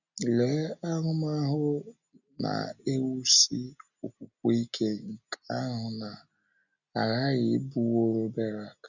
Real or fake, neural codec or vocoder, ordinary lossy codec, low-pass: real; none; none; 7.2 kHz